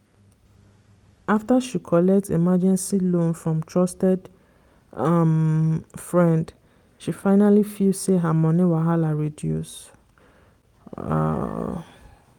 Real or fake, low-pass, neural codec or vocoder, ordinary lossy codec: real; 19.8 kHz; none; Opus, 32 kbps